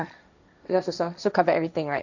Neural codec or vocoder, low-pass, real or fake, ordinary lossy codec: codec, 16 kHz, 1.1 kbps, Voila-Tokenizer; 7.2 kHz; fake; none